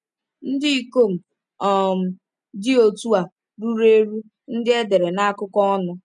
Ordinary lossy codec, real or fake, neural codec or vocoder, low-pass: none; real; none; 10.8 kHz